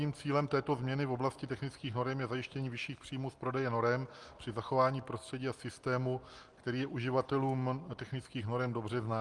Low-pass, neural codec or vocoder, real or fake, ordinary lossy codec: 10.8 kHz; none; real; Opus, 24 kbps